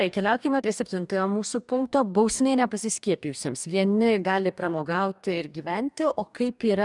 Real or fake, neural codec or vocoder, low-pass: fake; codec, 44.1 kHz, 2.6 kbps, DAC; 10.8 kHz